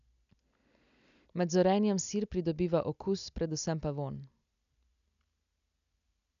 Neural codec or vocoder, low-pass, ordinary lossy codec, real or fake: none; 7.2 kHz; none; real